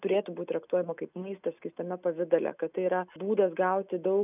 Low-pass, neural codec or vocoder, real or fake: 3.6 kHz; none; real